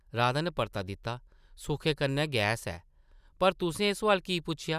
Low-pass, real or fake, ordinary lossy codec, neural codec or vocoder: 14.4 kHz; real; none; none